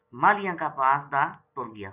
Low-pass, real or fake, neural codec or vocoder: 3.6 kHz; real; none